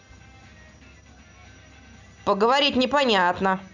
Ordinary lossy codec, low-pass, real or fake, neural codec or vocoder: none; 7.2 kHz; real; none